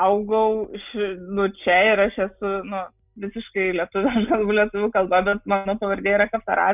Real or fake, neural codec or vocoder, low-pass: real; none; 3.6 kHz